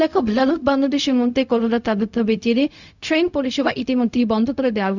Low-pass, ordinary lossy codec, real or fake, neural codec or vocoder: 7.2 kHz; none; fake; codec, 16 kHz, 0.4 kbps, LongCat-Audio-Codec